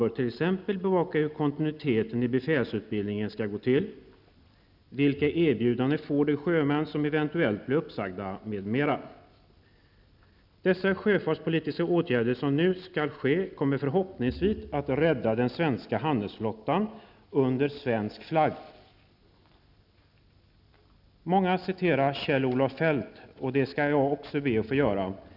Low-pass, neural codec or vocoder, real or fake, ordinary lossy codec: 5.4 kHz; none; real; none